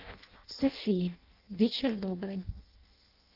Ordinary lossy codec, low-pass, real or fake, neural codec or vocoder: Opus, 24 kbps; 5.4 kHz; fake; codec, 16 kHz in and 24 kHz out, 0.6 kbps, FireRedTTS-2 codec